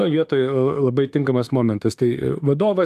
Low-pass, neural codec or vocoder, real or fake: 14.4 kHz; autoencoder, 48 kHz, 32 numbers a frame, DAC-VAE, trained on Japanese speech; fake